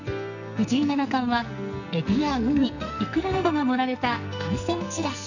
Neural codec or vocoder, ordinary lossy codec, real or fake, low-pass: codec, 44.1 kHz, 2.6 kbps, SNAC; none; fake; 7.2 kHz